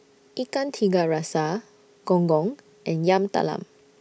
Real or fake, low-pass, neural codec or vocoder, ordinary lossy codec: real; none; none; none